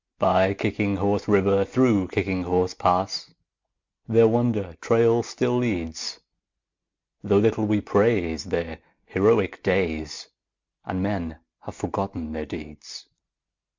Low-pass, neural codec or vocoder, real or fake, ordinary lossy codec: 7.2 kHz; none; real; MP3, 64 kbps